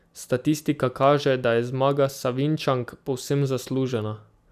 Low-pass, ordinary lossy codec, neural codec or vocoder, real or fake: 14.4 kHz; none; none; real